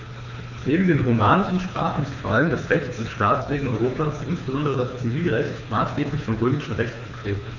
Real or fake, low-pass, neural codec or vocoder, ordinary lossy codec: fake; 7.2 kHz; codec, 24 kHz, 3 kbps, HILCodec; none